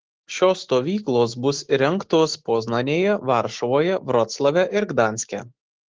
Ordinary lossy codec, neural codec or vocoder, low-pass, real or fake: Opus, 16 kbps; autoencoder, 48 kHz, 128 numbers a frame, DAC-VAE, trained on Japanese speech; 7.2 kHz; fake